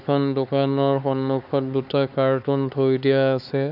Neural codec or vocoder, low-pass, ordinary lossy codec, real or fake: codec, 16 kHz, 4 kbps, X-Codec, HuBERT features, trained on LibriSpeech; 5.4 kHz; none; fake